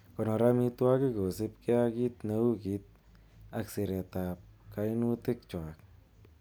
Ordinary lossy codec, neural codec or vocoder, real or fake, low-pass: none; none; real; none